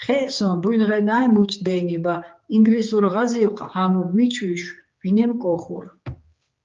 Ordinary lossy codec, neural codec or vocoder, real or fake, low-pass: Opus, 32 kbps; codec, 16 kHz, 4 kbps, X-Codec, HuBERT features, trained on general audio; fake; 7.2 kHz